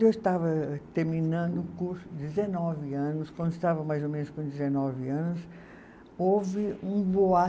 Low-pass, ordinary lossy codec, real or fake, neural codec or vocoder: none; none; real; none